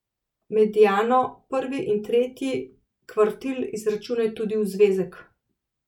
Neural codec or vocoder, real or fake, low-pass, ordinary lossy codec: none; real; 19.8 kHz; none